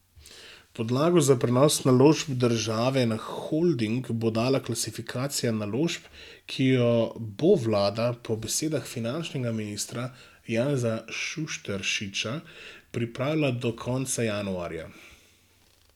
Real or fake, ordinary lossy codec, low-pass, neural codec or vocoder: real; none; 19.8 kHz; none